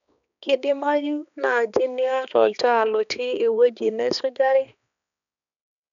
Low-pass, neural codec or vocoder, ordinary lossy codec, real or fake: 7.2 kHz; codec, 16 kHz, 2 kbps, X-Codec, HuBERT features, trained on balanced general audio; none; fake